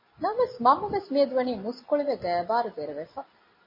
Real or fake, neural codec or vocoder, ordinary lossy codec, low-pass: real; none; MP3, 24 kbps; 5.4 kHz